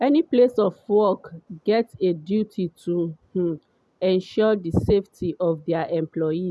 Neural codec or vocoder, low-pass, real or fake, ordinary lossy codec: none; none; real; none